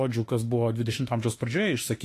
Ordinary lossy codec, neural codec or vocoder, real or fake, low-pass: AAC, 48 kbps; autoencoder, 48 kHz, 32 numbers a frame, DAC-VAE, trained on Japanese speech; fake; 14.4 kHz